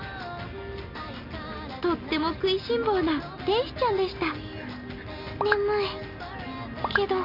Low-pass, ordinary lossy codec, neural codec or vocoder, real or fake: 5.4 kHz; none; none; real